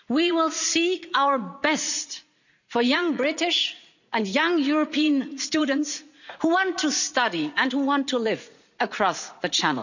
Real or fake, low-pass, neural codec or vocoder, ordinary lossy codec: fake; 7.2 kHz; vocoder, 44.1 kHz, 80 mel bands, Vocos; none